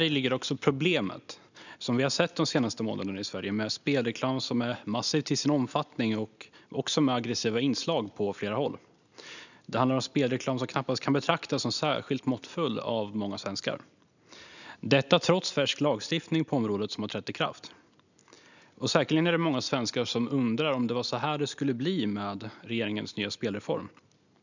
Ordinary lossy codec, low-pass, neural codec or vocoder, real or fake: none; 7.2 kHz; none; real